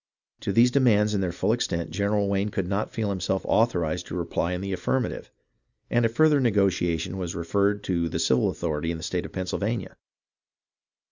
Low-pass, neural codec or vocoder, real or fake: 7.2 kHz; none; real